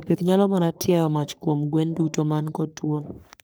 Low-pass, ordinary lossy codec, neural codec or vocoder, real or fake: none; none; codec, 44.1 kHz, 3.4 kbps, Pupu-Codec; fake